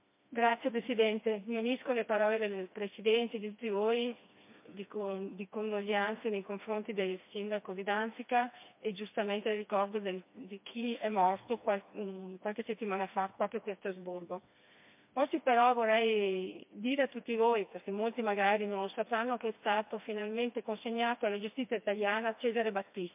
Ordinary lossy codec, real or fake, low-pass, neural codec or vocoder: MP3, 32 kbps; fake; 3.6 kHz; codec, 16 kHz, 2 kbps, FreqCodec, smaller model